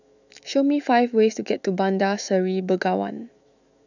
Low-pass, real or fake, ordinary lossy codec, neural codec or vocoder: 7.2 kHz; fake; none; autoencoder, 48 kHz, 128 numbers a frame, DAC-VAE, trained on Japanese speech